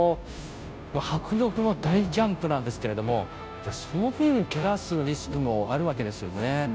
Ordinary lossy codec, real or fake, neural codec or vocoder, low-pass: none; fake; codec, 16 kHz, 0.5 kbps, FunCodec, trained on Chinese and English, 25 frames a second; none